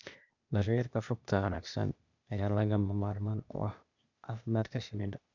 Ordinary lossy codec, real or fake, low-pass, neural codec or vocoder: none; fake; 7.2 kHz; codec, 16 kHz, 0.8 kbps, ZipCodec